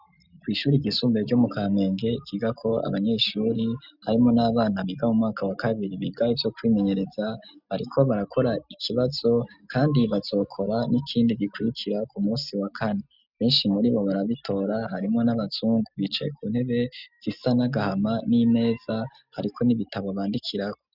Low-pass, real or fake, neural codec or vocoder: 5.4 kHz; real; none